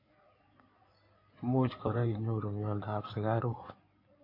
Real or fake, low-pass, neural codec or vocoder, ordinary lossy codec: fake; 5.4 kHz; codec, 16 kHz in and 24 kHz out, 2.2 kbps, FireRedTTS-2 codec; MP3, 32 kbps